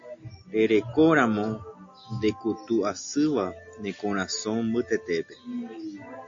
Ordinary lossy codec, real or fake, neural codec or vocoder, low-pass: AAC, 48 kbps; real; none; 7.2 kHz